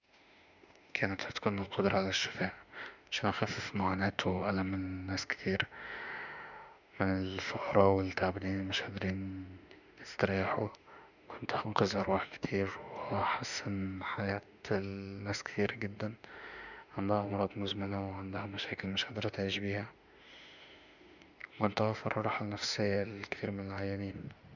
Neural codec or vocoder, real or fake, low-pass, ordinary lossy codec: autoencoder, 48 kHz, 32 numbers a frame, DAC-VAE, trained on Japanese speech; fake; 7.2 kHz; none